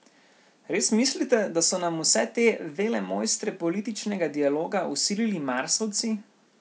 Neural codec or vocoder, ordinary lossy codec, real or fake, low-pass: none; none; real; none